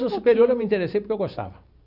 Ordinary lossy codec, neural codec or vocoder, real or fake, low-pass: none; vocoder, 44.1 kHz, 128 mel bands every 512 samples, BigVGAN v2; fake; 5.4 kHz